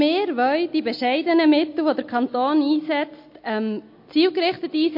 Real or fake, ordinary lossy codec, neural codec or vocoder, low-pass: real; MP3, 32 kbps; none; 5.4 kHz